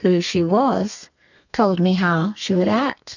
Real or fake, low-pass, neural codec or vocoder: fake; 7.2 kHz; codec, 32 kHz, 1.9 kbps, SNAC